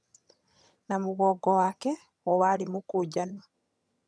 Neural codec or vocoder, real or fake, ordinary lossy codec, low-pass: vocoder, 22.05 kHz, 80 mel bands, HiFi-GAN; fake; none; none